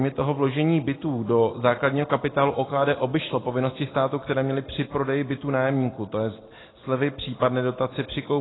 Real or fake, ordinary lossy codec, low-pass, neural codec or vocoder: real; AAC, 16 kbps; 7.2 kHz; none